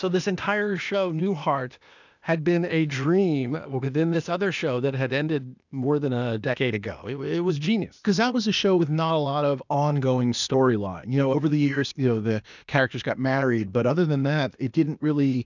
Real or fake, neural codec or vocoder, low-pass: fake; codec, 16 kHz, 0.8 kbps, ZipCodec; 7.2 kHz